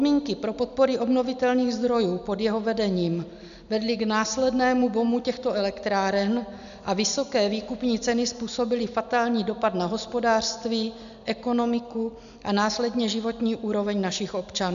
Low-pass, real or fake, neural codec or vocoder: 7.2 kHz; real; none